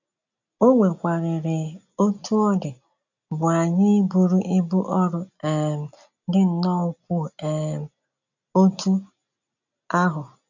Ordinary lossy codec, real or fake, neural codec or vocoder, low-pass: none; real; none; 7.2 kHz